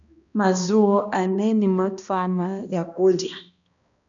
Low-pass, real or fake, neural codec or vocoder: 7.2 kHz; fake; codec, 16 kHz, 1 kbps, X-Codec, HuBERT features, trained on balanced general audio